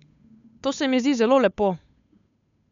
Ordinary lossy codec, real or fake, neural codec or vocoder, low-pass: none; fake; codec, 16 kHz, 8 kbps, FunCodec, trained on Chinese and English, 25 frames a second; 7.2 kHz